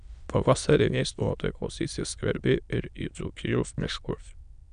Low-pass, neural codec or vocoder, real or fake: 9.9 kHz; autoencoder, 22.05 kHz, a latent of 192 numbers a frame, VITS, trained on many speakers; fake